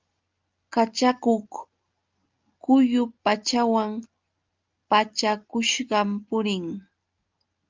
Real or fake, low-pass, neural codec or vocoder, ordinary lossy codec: real; 7.2 kHz; none; Opus, 24 kbps